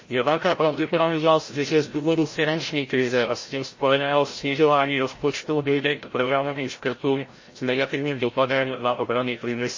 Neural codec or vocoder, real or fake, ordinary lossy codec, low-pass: codec, 16 kHz, 0.5 kbps, FreqCodec, larger model; fake; MP3, 32 kbps; 7.2 kHz